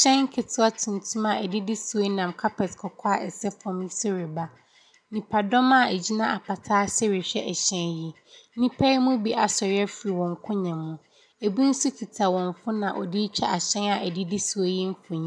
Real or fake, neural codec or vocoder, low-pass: real; none; 9.9 kHz